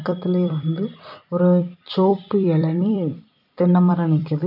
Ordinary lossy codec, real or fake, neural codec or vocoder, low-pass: none; fake; vocoder, 44.1 kHz, 128 mel bands every 256 samples, BigVGAN v2; 5.4 kHz